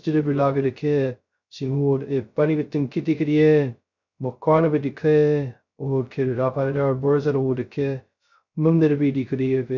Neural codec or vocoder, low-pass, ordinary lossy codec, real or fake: codec, 16 kHz, 0.2 kbps, FocalCodec; 7.2 kHz; none; fake